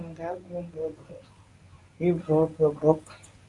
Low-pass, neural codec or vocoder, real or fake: 10.8 kHz; codec, 24 kHz, 0.9 kbps, WavTokenizer, medium speech release version 1; fake